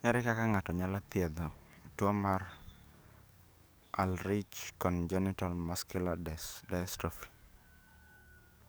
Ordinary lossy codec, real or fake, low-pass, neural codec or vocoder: none; fake; none; codec, 44.1 kHz, 7.8 kbps, DAC